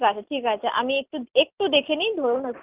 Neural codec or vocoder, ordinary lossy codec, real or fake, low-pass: none; Opus, 32 kbps; real; 3.6 kHz